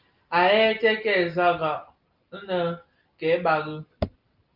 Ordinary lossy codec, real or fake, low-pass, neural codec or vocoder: Opus, 32 kbps; real; 5.4 kHz; none